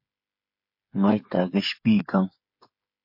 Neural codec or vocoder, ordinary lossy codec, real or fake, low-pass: codec, 16 kHz, 8 kbps, FreqCodec, smaller model; MP3, 32 kbps; fake; 5.4 kHz